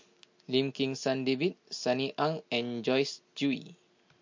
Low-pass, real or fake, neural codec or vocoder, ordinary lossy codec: 7.2 kHz; real; none; MP3, 48 kbps